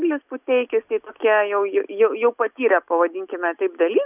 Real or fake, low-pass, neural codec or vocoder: real; 3.6 kHz; none